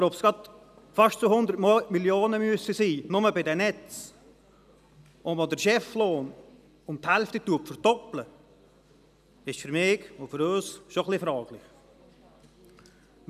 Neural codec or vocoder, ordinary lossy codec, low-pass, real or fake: none; none; 14.4 kHz; real